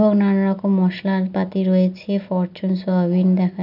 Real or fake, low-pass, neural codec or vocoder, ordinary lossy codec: real; 5.4 kHz; none; none